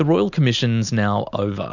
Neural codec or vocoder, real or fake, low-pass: none; real; 7.2 kHz